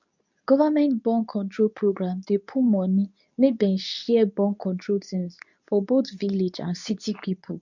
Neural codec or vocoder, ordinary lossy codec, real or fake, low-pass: codec, 24 kHz, 0.9 kbps, WavTokenizer, medium speech release version 2; none; fake; 7.2 kHz